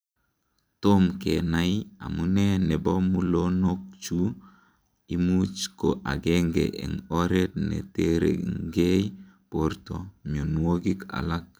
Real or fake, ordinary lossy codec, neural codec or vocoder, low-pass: real; none; none; none